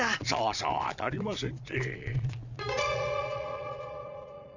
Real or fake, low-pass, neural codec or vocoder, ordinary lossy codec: fake; 7.2 kHz; vocoder, 22.05 kHz, 80 mel bands, Vocos; none